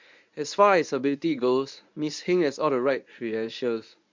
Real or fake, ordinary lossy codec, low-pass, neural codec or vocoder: fake; MP3, 64 kbps; 7.2 kHz; codec, 24 kHz, 0.9 kbps, WavTokenizer, medium speech release version 1